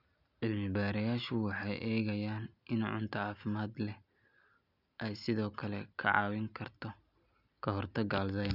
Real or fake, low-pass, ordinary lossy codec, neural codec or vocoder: real; 5.4 kHz; none; none